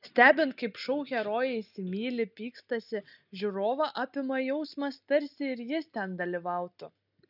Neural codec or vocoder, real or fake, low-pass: none; real; 5.4 kHz